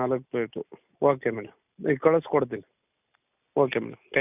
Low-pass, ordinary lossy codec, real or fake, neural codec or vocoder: 3.6 kHz; none; real; none